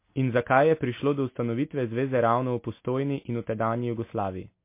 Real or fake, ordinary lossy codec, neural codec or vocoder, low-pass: real; MP3, 24 kbps; none; 3.6 kHz